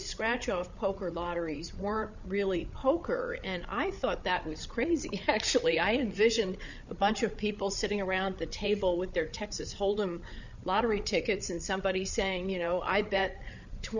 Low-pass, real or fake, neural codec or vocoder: 7.2 kHz; fake; codec, 16 kHz, 8 kbps, FreqCodec, larger model